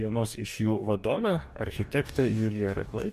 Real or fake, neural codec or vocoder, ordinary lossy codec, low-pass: fake; codec, 44.1 kHz, 2.6 kbps, DAC; MP3, 64 kbps; 14.4 kHz